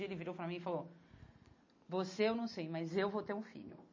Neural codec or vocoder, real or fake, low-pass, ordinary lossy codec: none; real; 7.2 kHz; MP3, 32 kbps